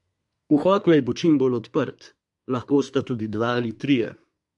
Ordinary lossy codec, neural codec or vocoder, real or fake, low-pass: MP3, 64 kbps; codec, 24 kHz, 1 kbps, SNAC; fake; 10.8 kHz